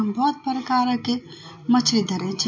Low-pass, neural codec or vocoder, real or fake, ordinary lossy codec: 7.2 kHz; none; real; MP3, 48 kbps